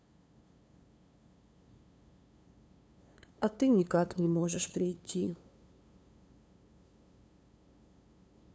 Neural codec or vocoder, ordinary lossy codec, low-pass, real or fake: codec, 16 kHz, 2 kbps, FunCodec, trained on LibriTTS, 25 frames a second; none; none; fake